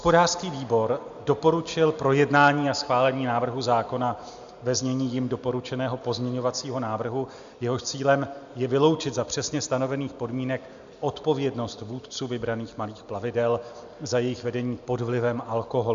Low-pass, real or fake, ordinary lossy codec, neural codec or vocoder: 7.2 kHz; real; MP3, 64 kbps; none